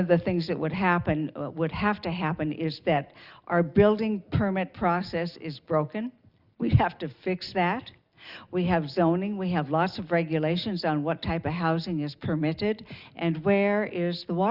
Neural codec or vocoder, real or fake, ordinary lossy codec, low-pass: none; real; Opus, 64 kbps; 5.4 kHz